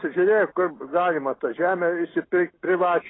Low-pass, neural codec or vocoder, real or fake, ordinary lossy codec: 7.2 kHz; none; real; AAC, 16 kbps